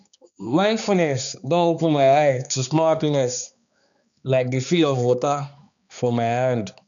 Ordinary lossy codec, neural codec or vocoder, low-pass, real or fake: none; codec, 16 kHz, 2 kbps, X-Codec, HuBERT features, trained on balanced general audio; 7.2 kHz; fake